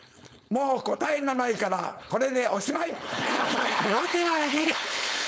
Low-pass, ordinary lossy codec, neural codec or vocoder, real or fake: none; none; codec, 16 kHz, 4.8 kbps, FACodec; fake